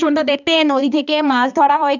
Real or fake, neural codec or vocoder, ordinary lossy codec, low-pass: fake; codec, 16 kHz, 2 kbps, X-Codec, HuBERT features, trained on balanced general audio; none; 7.2 kHz